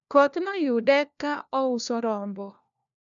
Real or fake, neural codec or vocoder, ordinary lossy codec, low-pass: fake; codec, 16 kHz, 1 kbps, FunCodec, trained on LibriTTS, 50 frames a second; none; 7.2 kHz